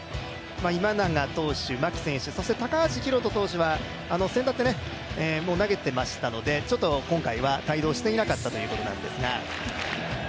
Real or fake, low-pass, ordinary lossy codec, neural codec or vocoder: real; none; none; none